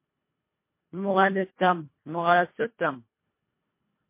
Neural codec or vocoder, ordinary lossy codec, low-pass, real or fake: codec, 24 kHz, 1.5 kbps, HILCodec; MP3, 24 kbps; 3.6 kHz; fake